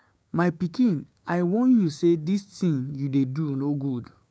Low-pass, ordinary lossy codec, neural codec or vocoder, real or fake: none; none; codec, 16 kHz, 6 kbps, DAC; fake